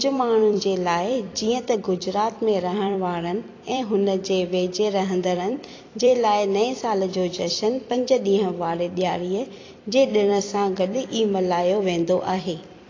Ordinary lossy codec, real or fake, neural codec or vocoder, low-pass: AAC, 32 kbps; fake; vocoder, 44.1 kHz, 128 mel bands every 256 samples, BigVGAN v2; 7.2 kHz